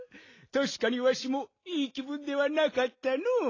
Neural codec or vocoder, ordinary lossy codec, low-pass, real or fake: none; AAC, 32 kbps; 7.2 kHz; real